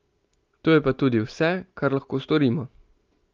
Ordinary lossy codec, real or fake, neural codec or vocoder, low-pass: Opus, 24 kbps; real; none; 7.2 kHz